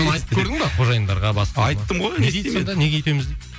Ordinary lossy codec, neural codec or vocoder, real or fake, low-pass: none; none; real; none